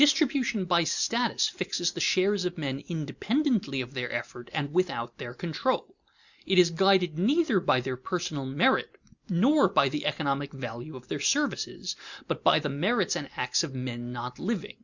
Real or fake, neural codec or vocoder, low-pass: real; none; 7.2 kHz